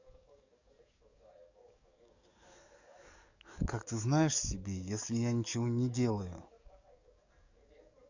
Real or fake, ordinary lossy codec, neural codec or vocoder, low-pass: fake; none; codec, 44.1 kHz, 7.8 kbps, DAC; 7.2 kHz